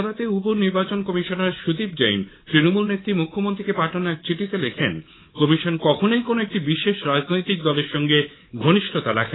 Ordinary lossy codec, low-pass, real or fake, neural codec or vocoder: AAC, 16 kbps; 7.2 kHz; fake; codec, 24 kHz, 3.1 kbps, DualCodec